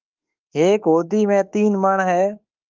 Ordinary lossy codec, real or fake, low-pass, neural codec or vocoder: Opus, 32 kbps; fake; 7.2 kHz; autoencoder, 48 kHz, 32 numbers a frame, DAC-VAE, trained on Japanese speech